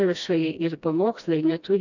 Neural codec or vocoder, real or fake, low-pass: codec, 16 kHz, 1 kbps, FreqCodec, smaller model; fake; 7.2 kHz